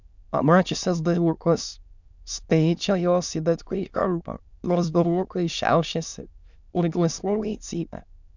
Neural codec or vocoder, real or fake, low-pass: autoencoder, 22.05 kHz, a latent of 192 numbers a frame, VITS, trained on many speakers; fake; 7.2 kHz